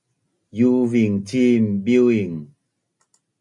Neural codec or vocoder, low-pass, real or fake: none; 10.8 kHz; real